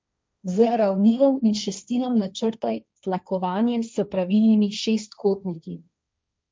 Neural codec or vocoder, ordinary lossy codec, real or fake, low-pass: codec, 16 kHz, 1.1 kbps, Voila-Tokenizer; none; fake; none